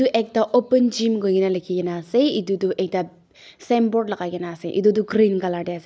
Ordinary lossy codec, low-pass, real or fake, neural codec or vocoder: none; none; real; none